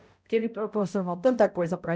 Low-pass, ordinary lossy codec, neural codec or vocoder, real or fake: none; none; codec, 16 kHz, 0.5 kbps, X-Codec, HuBERT features, trained on balanced general audio; fake